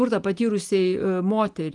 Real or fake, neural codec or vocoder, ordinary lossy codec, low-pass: real; none; Opus, 32 kbps; 10.8 kHz